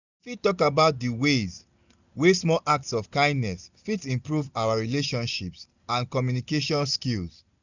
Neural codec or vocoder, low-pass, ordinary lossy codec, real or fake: none; 7.2 kHz; none; real